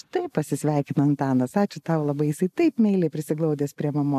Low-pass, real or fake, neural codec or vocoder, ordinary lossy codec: 14.4 kHz; real; none; AAC, 96 kbps